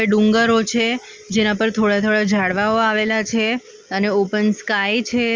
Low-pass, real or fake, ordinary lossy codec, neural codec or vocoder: 7.2 kHz; real; Opus, 32 kbps; none